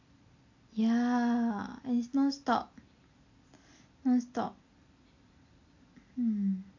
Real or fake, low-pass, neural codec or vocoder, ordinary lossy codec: real; 7.2 kHz; none; AAC, 48 kbps